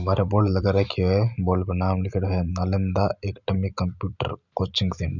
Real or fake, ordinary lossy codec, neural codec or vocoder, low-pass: real; none; none; 7.2 kHz